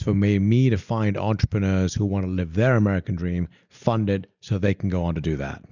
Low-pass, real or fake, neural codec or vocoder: 7.2 kHz; real; none